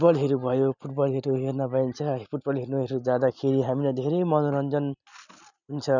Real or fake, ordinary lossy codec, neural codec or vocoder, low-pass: real; none; none; 7.2 kHz